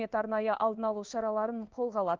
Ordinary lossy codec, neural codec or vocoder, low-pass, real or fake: Opus, 24 kbps; codec, 16 kHz in and 24 kHz out, 1 kbps, XY-Tokenizer; 7.2 kHz; fake